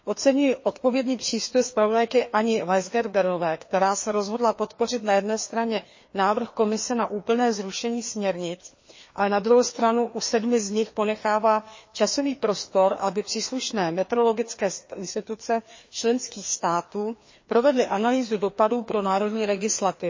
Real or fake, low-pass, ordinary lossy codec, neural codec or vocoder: fake; 7.2 kHz; MP3, 32 kbps; codec, 16 kHz, 2 kbps, FreqCodec, larger model